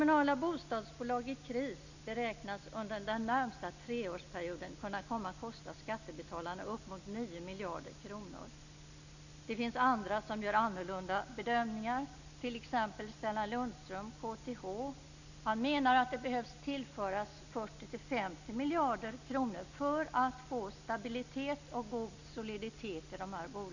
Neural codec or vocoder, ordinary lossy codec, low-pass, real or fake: none; none; 7.2 kHz; real